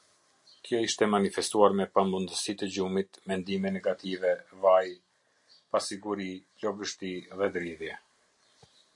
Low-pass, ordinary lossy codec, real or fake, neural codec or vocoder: 10.8 kHz; MP3, 48 kbps; real; none